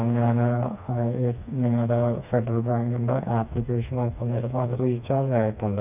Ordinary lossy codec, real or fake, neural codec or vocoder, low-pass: none; fake; codec, 16 kHz, 2 kbps, FreqCodec, smaller model; 3.6 kHz